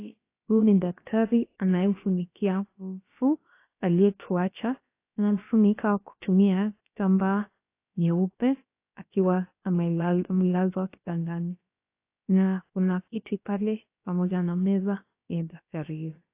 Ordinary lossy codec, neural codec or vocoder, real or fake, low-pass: AAC, 24 kbps; codec, 16 kHz, about 1 kbps, DyCAST, with the encoder's durations; fake; 3.6 kHz